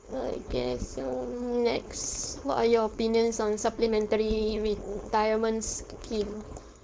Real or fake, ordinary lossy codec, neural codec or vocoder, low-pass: fake; none; codec, 16 kHz, 4.8 kbps, FACodec; none